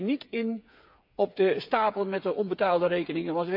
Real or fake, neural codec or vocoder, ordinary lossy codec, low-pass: fake; codec, 16 kHz, 8 kbps, FreqCodec, smaller model; none; 5.4 kHz